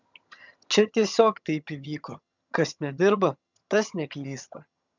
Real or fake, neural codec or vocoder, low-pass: fake; vocoder, 22.05 kHz, 80 mel bands, HiFi-GAN; 7.2 kHz